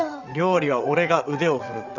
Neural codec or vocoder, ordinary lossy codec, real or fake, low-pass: vocoder, 22.05 kHz, 80 mel bands, WaveNeXt; none; fake; 7.2 kHz